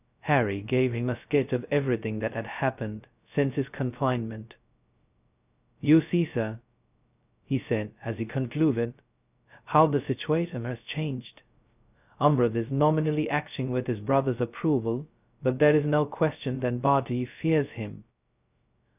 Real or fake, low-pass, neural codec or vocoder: fake; 3.6 kHz; codec, 16 kHz, 0.2 kbps, FocalCodec